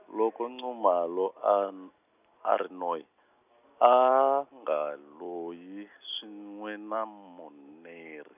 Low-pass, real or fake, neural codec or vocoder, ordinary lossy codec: 3.6 kHz; real; none; none